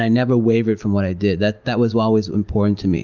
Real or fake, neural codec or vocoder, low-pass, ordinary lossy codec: real; none; 7.2 kHz; Opus, 24 kbps